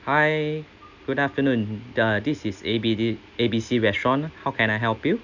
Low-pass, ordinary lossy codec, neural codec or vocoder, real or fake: 7.2 kHz; none; none; real